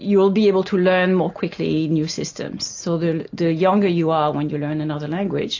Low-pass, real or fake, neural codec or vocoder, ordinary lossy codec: 7.2 kHz; real; none; AAC, 48 kbps